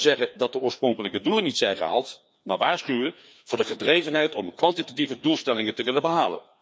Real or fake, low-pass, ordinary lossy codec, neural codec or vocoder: fake; none; none; codec, 16 kHz, 2 kbps, FreqCodec, larger model